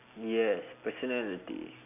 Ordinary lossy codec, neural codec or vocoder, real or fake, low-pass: none; none; real; 3.6 kHz